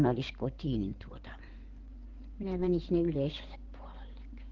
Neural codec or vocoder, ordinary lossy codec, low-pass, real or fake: codec, 16 kHz in and 24 kHz out, 2.2 kbps, FireRedTTS-2 codec; Opus, 16 kbps; 7.2 kHz; fake